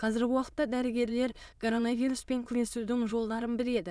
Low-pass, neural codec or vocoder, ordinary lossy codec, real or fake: none; autoencoder, 22.05 kHz, a latent of 192 numbers a frame, VITS, trained on many speakers; none; fake